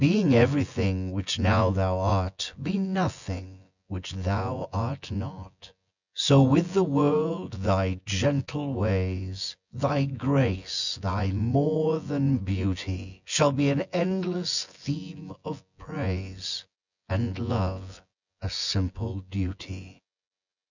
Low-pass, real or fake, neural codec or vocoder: 7.2 kHz; fake; vocoder, 24 kHz, 100 mel bands, Vocos